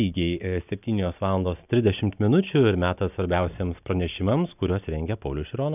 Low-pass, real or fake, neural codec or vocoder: 3.6 kHz; real; none